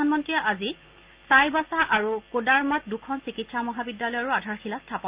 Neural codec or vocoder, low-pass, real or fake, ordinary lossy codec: none; 3.6 kHz; real; Opus, 32 kbps